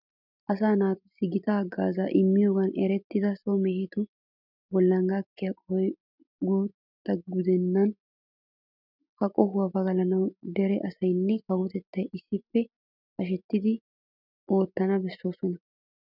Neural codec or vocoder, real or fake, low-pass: none; real; 5.4 kHz